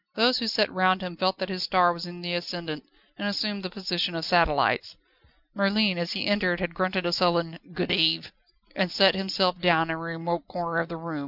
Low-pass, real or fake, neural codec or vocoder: 5.4 kHz; real; none